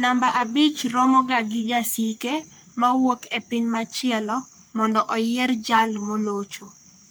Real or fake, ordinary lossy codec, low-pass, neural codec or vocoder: fake; none; none; codec, 44.1 kHz, 3.4 kbps, Pupu-Codec